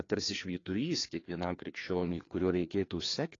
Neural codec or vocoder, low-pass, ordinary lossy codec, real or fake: codec, 16 kHz, 2 kbps, FreqCodec, larger model; 7.2 kHz; AAC, 32 kbps; fake